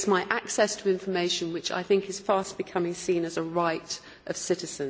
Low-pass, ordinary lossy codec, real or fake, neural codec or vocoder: none; none; real; none